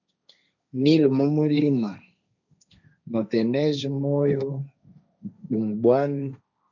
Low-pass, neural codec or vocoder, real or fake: 7.2 kHz; codec, 16 kHz, 1.1 kbps, Voila-Tokenizer; fake